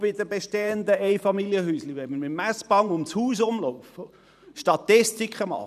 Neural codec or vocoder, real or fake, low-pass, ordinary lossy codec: vocoder, 44.1 kHz, 128 mel bands every 512 samples, BigVGAN v2; fake; 14.4 kHz; none